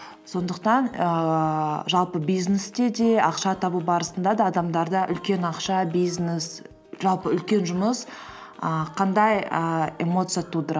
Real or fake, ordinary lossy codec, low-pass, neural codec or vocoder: real; none; none; none